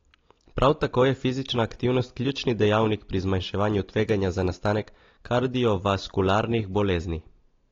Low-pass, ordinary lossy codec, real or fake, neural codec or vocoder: 7.2 kHz; AAC, 32 kbps; real; none